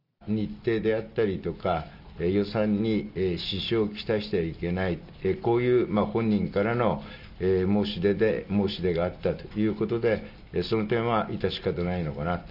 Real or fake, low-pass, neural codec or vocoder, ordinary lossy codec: real; 5.4 kHz; none; none